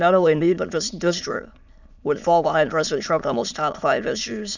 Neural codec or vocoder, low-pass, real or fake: autoencoder, 22.05 kHz, a latent of 192 numbers a frame, VITS, trained on many speakers; 7.2 kHz; fake